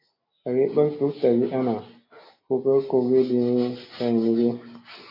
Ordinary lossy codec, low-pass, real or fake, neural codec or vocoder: AAC, 24 kbps; 5.4 kHz; real; none